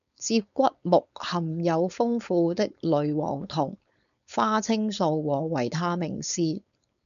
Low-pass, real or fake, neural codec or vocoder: 7.2 kHz; fake; codec, 16 kHz, 4.8 kbps, FACodec